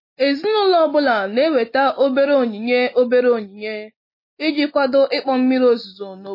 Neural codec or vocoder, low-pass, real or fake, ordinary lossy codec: none; 5.4 kHz; real; MP3, 24 kbps